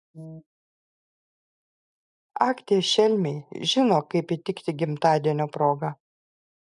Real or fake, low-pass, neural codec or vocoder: real; 10.8 kHz; none